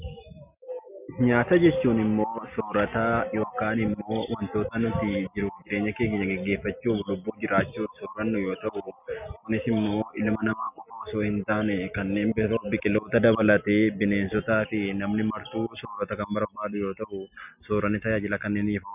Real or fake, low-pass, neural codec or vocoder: real; 3.6 kHz; none